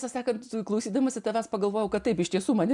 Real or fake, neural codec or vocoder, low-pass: real; none; 10.8 kHz